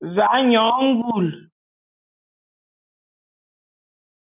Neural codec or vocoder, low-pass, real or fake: none; 3.6 kHz; real